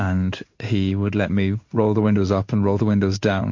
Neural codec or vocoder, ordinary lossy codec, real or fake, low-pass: codec, 16 kHz in and 24 kHz out, 1 kbps, XY-Tokenizer; MP3, 48 kbps; fake; 7.2 kHz